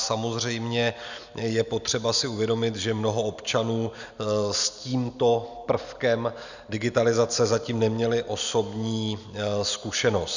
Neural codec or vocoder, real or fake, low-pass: none; real; 7.2 kHz